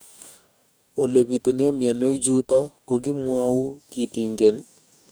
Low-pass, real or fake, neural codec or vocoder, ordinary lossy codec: none; fake; codec, 44.1 kHz, 2.6 kbps, DAC; none